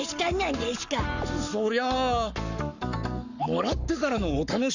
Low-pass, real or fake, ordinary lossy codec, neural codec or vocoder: 7.2 kHz; fake; none; codec, 44.1 kHz, 7.8 kbps, Pupu-Codec